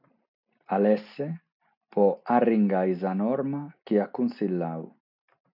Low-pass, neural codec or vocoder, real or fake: 5.4 kHz; none; real